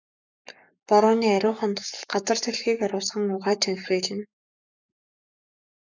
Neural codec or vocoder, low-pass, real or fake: codec, 44.1 kHz, 7.8 kbps, Pupu-Codec; 7.2 kHz; fake